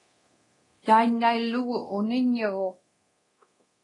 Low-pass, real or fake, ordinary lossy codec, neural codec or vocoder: 10.8 kHz; fake; AAC, 32 kbps; codec, 24 kHz, 0.9 kbps, DualCodec